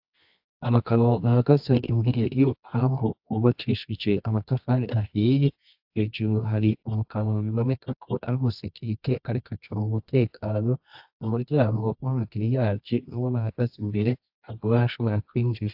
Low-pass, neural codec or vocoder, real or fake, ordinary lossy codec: 5.4 kHz; codec, 24 kHz, 0.9 kbps, WavTokenizer, medium music audio release; fake; AAC, 48 kbps